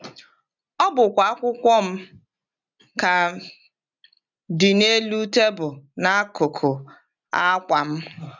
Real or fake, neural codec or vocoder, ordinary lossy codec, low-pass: real; none; none; 7.2 kHz